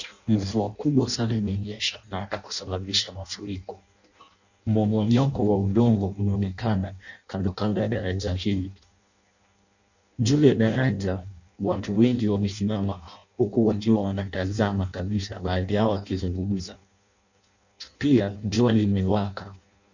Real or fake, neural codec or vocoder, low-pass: fake; codec, 16 kHz in and 24 kHz out, 0.6 kbps, FireRedTTS-2 codec; 7.2 kHz